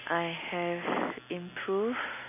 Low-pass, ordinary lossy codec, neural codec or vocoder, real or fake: 3.6 kHz; MP3, 24 kbps; none; real